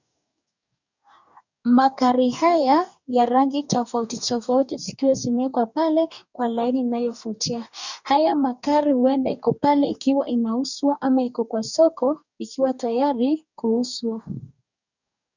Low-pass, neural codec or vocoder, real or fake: 7.2 kHz; codec, 44.1 kHz, 2.6 kbps, DAC; fake